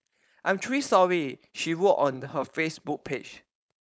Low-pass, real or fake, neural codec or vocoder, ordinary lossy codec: none; fake; codec, 16 kHz, 4.8 kbps, FACodec; none